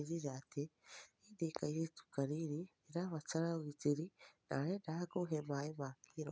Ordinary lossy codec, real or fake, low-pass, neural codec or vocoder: none; real; none; none